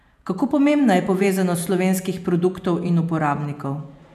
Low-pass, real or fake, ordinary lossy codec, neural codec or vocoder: 14.4 kHz; fake; none; vocoder, 48 kHz, 128 mel bands, Vocos